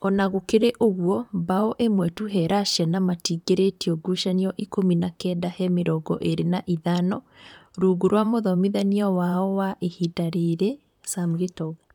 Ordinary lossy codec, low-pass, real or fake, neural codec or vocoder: none; 19.8 kHz; fake; vocoder, 44.1 kHz, 128 mel bands, Pupu-Vocoder